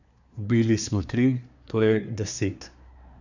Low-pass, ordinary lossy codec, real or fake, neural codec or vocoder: 7.2 kHz; none; fake; codec, 24 kHz, 1 kbps, SNAC